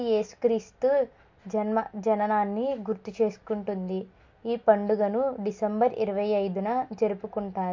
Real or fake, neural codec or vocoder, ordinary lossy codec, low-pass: real; none; MP3, 48 kbps; 7.2 kHz